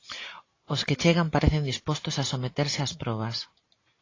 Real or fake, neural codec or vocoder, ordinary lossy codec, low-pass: real; none; AAC, 32 kbps; 7.2 kHz